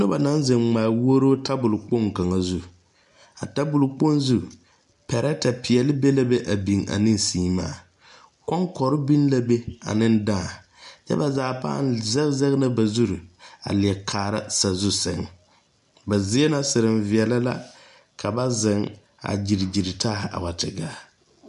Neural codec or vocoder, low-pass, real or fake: none; 10.8 kHz; real